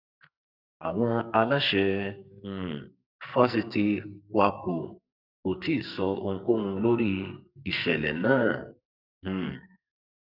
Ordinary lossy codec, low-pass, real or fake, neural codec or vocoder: none; 5.4 kHz; fake; codec, 44.1 kHz, 2.6 kbps, SNAC